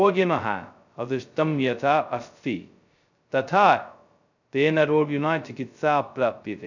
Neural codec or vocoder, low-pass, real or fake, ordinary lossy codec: codec, 16 kHz, 0.2 kbps, FocalCodec; 7.2 kHz; fake; none